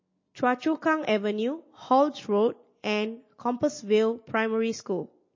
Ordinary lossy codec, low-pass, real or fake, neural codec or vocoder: MP3, 32 kbps; 7.2 kHz; real; none